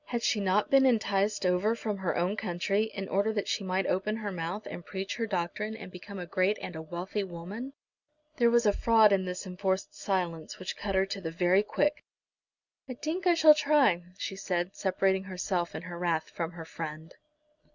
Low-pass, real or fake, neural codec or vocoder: 7.2 kHz; real; none